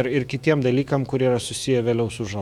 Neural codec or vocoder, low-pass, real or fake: autoencoder, 48 kHz, 128 numbers a frame, DAC-VAE, trained on Japanese speech; 19.8 kHz; fake